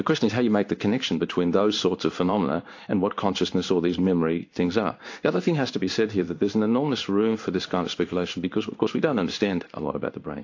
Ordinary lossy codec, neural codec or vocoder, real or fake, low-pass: AAC, 48 kbps; codec, 16 kHz in and 24 kHz out, 1 kbps, XY-Tokenizer; fake; 7.2 kHz